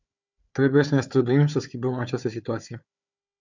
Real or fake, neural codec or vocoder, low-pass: fake; codec, 16 kHz, 4 kbps, FunCodec, trained on Chinese and English, 50 frames a second; 7.2 kHz